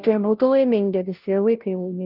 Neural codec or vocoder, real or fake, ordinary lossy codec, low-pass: codec, 16 kHz, 0.5 kbps, FunCodec, trained on Chinese and English, 25 frames a second; fake; Opus, 24 kbps; 5.4 kHz